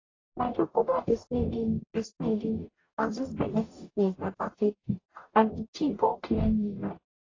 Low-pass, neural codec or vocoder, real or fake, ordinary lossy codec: 7.2 kHz; codec, 44.1 kHz, 0.9 kbps, DAC; fake; AAC, 32 kbps